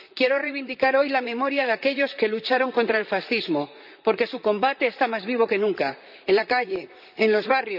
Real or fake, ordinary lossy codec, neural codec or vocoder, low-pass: fake; none; vocoder, 44.1 kHz, 128 mel bands, Pupu-Vocoder; 5.4 kHz